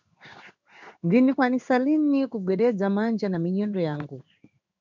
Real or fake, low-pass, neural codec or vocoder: fake; 7.2 kHz; codec, 16 kHz in and 24 kHz out, 1 kbps, XY-Tokenizer